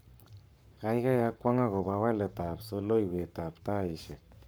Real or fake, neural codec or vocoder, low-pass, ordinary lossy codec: fake; codec, 44.1 kHz, 7.8 kbps, Pupu-Codec; none; none